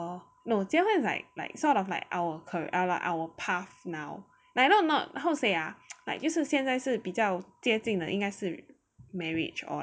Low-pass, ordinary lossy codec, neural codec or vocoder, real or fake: none; none; none; real